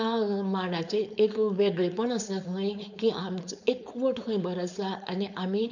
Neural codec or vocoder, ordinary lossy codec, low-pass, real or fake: codec, 16 kHz, 4.8 kbps, FACodec; none; 7.2 kHz; fake